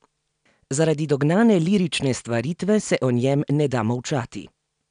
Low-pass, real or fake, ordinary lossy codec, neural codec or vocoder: 9.9 kHz; real; none; none